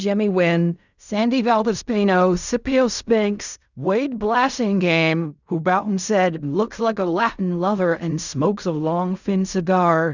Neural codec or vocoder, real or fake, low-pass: codec, 16 kHz in and 24 kHz out, 0.4 kbps, LongCat-Audio-Codec, fine tuned four codebook decoder; fake; 7.2 kHz